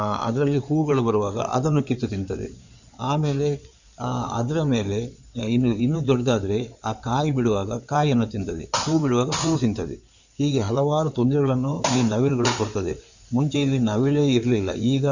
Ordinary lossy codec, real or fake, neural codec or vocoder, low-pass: none; fake; codec, 16 kHz in and 24 kHz out, 2.2 kbps, FireRedTTS-2 codec; 7.2 kHz